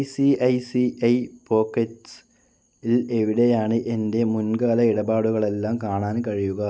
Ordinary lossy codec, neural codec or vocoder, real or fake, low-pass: none; none; real; none